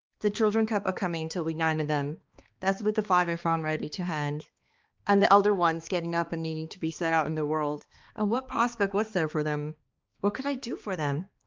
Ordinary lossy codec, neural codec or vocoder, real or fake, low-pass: Opus, 24 kbps; codec, 16 kHz, 2 kbps, X-Codec, HuBERT features, trained on balanced general audio; fake; 7.2 kHz